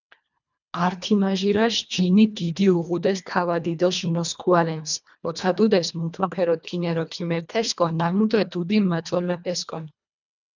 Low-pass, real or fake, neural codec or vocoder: 7.2 kHz; fake; codec, 24 kHz, 1.5 kbps, HILCodec